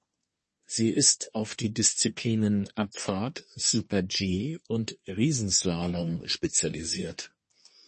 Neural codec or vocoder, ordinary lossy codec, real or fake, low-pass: codec, 24 kHz, 1 kbps, SNAC; MP3, 32 kbps; fake; 10.8 kHz